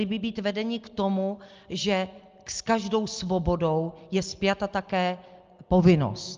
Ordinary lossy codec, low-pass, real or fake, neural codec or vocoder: Opus, 24 kbps; 7.2 kHz; real; none